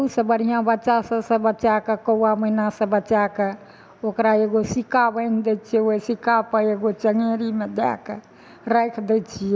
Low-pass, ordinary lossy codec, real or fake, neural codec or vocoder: none; none; real; none